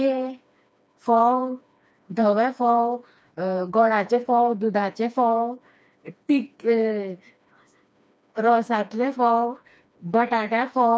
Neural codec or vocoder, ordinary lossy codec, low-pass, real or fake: codec, 16 kHz, 2 kbps, FreqCodec, smaller model; none; none; fake